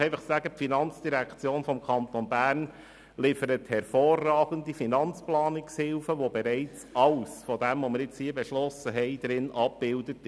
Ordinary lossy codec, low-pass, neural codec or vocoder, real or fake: none; none; none; real